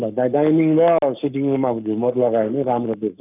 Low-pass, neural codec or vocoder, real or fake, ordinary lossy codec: 3.6 kHz; codec, 44.1 kHz, 7.8 kbps, Pupu-Codec; fake; none